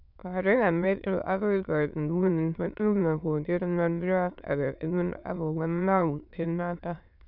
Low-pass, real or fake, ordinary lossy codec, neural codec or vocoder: 5.4 kHz; fake; none; autoencoder, 22.05 kHz, a latent of 192 numbers a frame, VITS, trained on many speakers